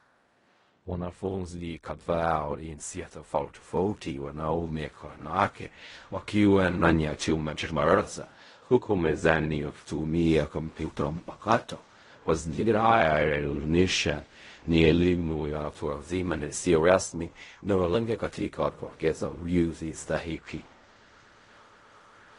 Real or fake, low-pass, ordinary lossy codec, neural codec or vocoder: fake; 10.8 kHz; AAC, 32 kbps; codec, 16 kHz in and 24 kHz out, 0.4 kbps, LongCat-Audio-Codec, fine tuned four codebook decoder